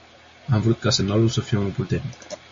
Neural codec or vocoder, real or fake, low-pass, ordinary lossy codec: none; real; 7.2 kHz; MP3, 32 kbps